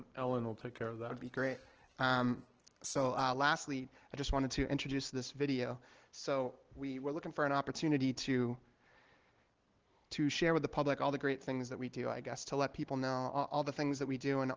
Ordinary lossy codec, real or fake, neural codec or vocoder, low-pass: Opus, 16 kbps; real; none; 7.2 kHz